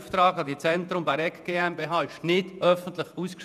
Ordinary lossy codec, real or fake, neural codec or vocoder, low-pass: none; fake; vocoder, 48 kHz, 128 mel bands, Vocos; 14.4 kHz